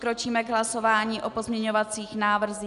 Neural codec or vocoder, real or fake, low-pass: none; real; 10.8 kHz